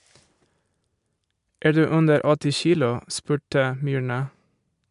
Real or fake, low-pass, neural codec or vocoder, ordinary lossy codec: real; 10.8 kHz; none; MP3, 96 kbps